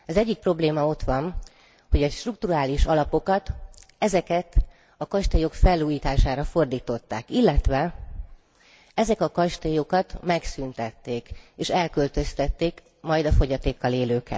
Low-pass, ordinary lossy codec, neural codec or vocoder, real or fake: none; none; none; real